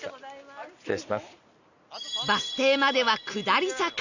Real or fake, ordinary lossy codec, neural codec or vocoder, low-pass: real; none; none; 7.2 kHz